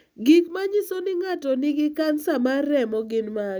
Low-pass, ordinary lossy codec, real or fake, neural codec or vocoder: none; none; real; none